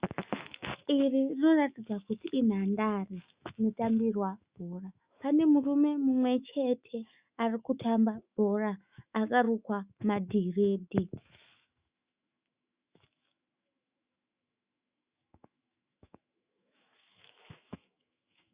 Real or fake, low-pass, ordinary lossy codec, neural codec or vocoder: fake; 3.6 kHz; Opus, 64 kbps; autoencoder, 48 kHz, 128 numbers a frame, DAC-VAE, trained on Japanese speech